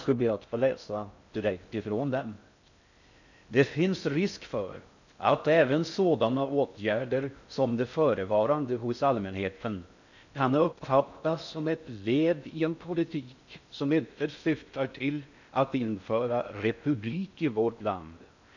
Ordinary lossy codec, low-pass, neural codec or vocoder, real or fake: none; 7.2 kHz; codec, 16 kHz in and 24 kHz out, 0.6 kbps, FocalCodec, streaming, 4096 codes; fake